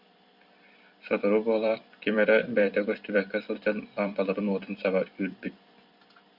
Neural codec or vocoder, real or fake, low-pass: vocoder, 44.1 kHz, 128 mel bands every 256 samples, BigVGAN v2; fake; 5.4 kHz